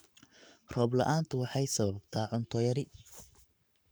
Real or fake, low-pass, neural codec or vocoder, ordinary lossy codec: fake; none; codec, 44.1 kHz, 7.8 kbps, Pupu-Codec; none